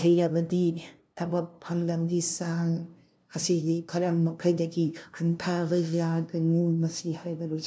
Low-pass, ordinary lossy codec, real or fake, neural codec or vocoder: none; none; fake; codec, 16 kHz, 0.5 kbps, FunCodec, trained on LibriTTS, 25 frames a second